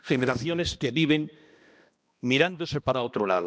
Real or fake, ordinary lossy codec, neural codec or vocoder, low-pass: fake; none; codec, 16 kHz, 1 kbps, X-Codec, HuBERT features, trained on balanced general audio; none